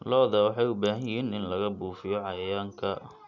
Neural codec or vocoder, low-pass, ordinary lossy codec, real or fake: none; 7.2 kHz; none; real